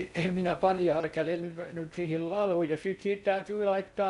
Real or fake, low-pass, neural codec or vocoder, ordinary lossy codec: fake; 10.8 kHz; codec, 16 kHz in and 24 kHz out, 0.6 kbps, FocalCodec, streaming, 4096 codes; none